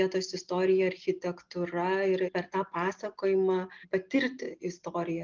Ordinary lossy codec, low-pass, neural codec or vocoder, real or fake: Opus, 32 kbps; 7.2 kHz; none; real